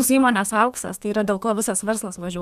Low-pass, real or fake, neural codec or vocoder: 14.4 kHz; fake; codec, 32 kHz, 1.9 kbps, SNAC